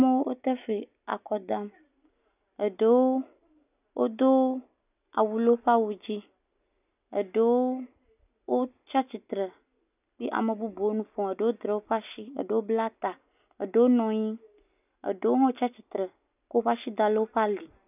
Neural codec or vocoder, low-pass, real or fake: none; 3.6 kHz; real